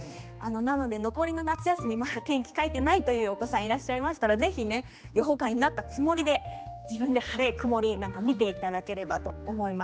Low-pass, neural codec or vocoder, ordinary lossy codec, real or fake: none; codec, 16 kHz, 2 kbps, X-Codec, HuBERT features, trained on general audio; none; fake